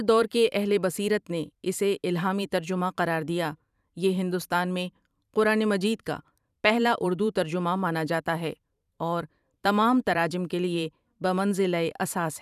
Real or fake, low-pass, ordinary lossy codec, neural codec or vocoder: fake; 14.4 kHz; none; vocoder, 44.1 kHz, 128 mel bands every 512 samples, BigVGAN v2